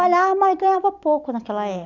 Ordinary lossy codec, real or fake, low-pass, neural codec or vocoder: none; real; 7.2 kHz; none